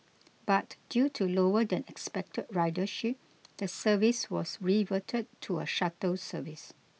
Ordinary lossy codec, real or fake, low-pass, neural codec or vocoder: none; real; none; none